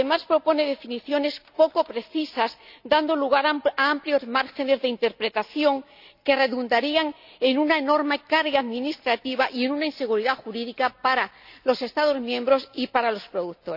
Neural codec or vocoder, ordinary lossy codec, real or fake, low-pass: none; none; real; 5.4 kHz